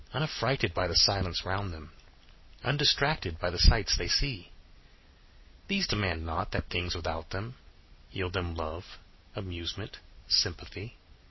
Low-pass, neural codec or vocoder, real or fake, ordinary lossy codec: 7.2 kHz; none; real; MP3, 24 kbps